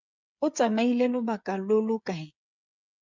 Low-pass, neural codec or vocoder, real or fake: 7.2 kHz; codec, 16 kHz, 8 kbps, FreqCodec, smaller model; fake